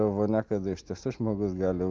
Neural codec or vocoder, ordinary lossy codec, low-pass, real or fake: none; Opus, 24 kbps; 7.2 kHz; real